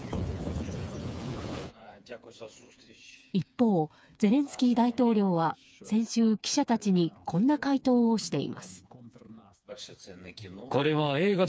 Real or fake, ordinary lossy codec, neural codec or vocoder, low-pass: fake; none; codec, 16 kHz, 4 kbps, FreqCodec, smaller model; none